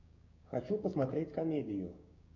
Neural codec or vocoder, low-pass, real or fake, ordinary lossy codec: codec, 16 kHz, 6 kbps, DAC; 7.2 kHz; fake; MP3, 48 kbps